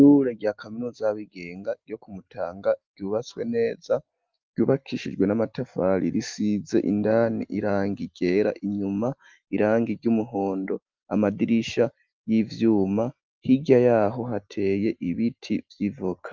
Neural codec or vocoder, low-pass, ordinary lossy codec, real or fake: none; 7.2 kHz; Opus, 32 kbps; real